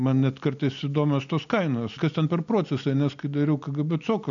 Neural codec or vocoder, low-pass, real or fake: none; 7.2 kHz; real